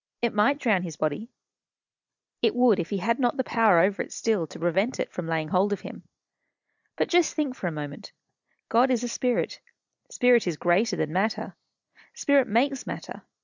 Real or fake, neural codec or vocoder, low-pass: real; none; 7.2 kHz